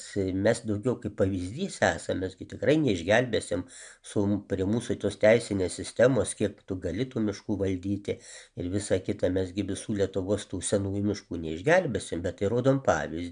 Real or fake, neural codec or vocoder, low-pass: real; none; 9.9 kHz